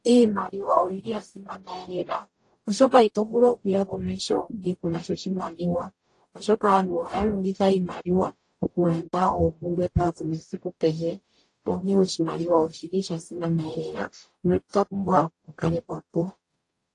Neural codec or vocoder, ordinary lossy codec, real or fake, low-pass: codec, 44.1 kHz, 0.9 kbps, DAC; AAC, 48 kbps; fake; 10.8 kHz